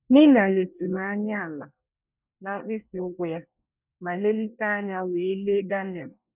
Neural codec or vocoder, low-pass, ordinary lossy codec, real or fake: codec, 24 kHz, 1 kbps, SNAC; 3.6 kHz; none; fake